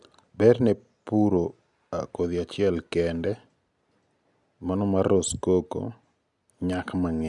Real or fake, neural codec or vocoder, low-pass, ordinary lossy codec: real; none; 10.8 kHz; none